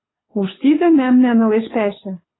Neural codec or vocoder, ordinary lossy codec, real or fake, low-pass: codec, 24 kHz, 6 kbps, HILCodec; AAC, 16 kbps; fake; 7.2 kHz